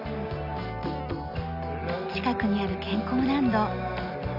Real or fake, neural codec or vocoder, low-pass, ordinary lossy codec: real; none; 5.4 kHz; none